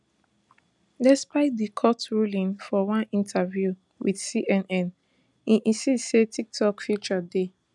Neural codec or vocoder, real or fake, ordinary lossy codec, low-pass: none; real; none; 10.8 kHz